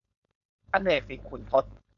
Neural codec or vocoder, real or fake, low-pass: codec, 16 kHz, 4.8 kbps, FACodec; fake; 7.2 kHz